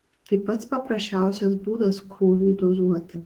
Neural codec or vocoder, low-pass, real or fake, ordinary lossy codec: autoencoder, 48 kHz, 32 numbers a frame, DAC-VAE, trained on Japanese speech; 19.8 kHz; fake; Opus, 16 kbps